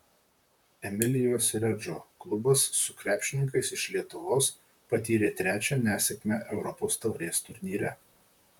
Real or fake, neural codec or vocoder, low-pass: fake; vocoder, 44.1 kHz, 128 mel bands, Pupu-Vocoder; 19.8 kHz